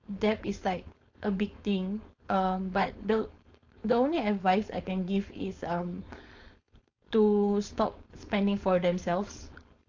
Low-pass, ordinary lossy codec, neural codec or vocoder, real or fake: 7.2 kHz; AAC, 48 kbps; codec, 16 kHz, 4.8 kbps, FACodec; fake